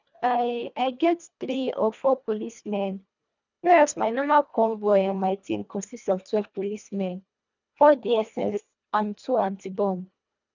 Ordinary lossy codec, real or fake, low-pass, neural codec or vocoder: none; fake; 7.2 kHz; codec, 24 kHz, 1.5 kbps, HILCodec